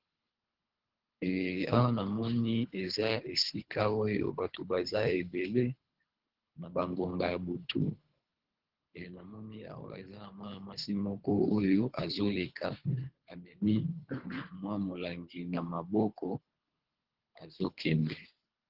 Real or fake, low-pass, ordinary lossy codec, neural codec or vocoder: fake; 5.4 kHz; Opus, 16 kbps; codec, 24 kHz, 3 kbps, HILCodec